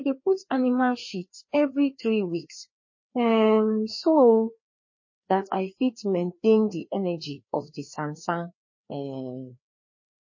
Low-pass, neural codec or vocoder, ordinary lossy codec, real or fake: 7.2 kHz; codec, 16 kHz, 2 kbps, FreqCodec, larger model; MP3, 32 kbps; fake